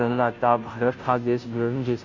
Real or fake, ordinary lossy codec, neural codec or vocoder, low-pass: fake; none; codec, 16 kHz, 0.5 kbps, FunCodec, trained on Chinese and English, 25 frames a second; 7.2 kHz